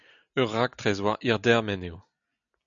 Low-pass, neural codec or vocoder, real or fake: 7.2 kHz; none; real